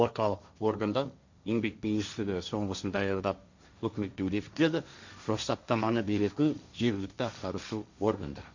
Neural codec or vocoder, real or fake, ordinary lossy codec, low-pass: codec, 16 kHz, 1.1 kbps, Voila-Tokenizer; fake; Opus, 64 kbps; 7.2 kHz